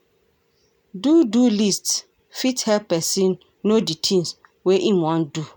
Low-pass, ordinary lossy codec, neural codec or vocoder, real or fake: none; none; none; real